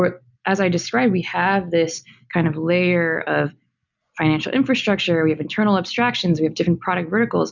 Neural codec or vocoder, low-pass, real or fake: none; 7.2 kHz; real